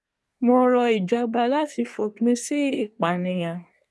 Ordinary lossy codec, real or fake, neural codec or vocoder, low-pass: none; fake; codec, 24 kHz, 1 kbps, SNAC; none